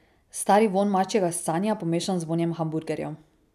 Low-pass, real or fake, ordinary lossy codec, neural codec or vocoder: 14.4 kHz; real; none; none